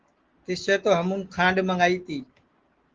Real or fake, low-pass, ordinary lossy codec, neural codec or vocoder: real; 7.2 kHz; Opus, 16 kbps; none